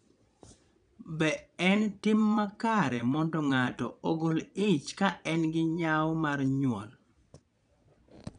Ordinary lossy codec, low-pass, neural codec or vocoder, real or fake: none; 9.9 kHz; vocoder, 22.05 kHz, 80 mel bands, Vocos; fake